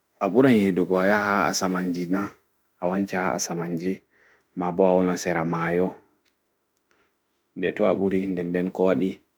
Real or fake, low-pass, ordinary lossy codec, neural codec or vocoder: fake; none; none; autoencoder, 48 kHz, 32 numbers a frame, DAC-VAE, trained on Japanese speech